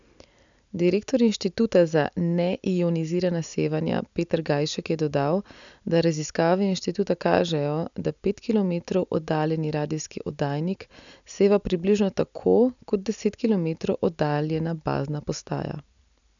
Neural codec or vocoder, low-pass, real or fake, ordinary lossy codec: none; 7.2 kHz; real; none